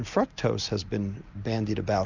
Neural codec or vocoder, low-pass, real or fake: none; 7.2 kHz; real